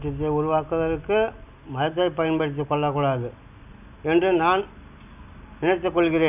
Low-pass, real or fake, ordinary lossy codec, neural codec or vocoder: 3.6 kHz; real; none; none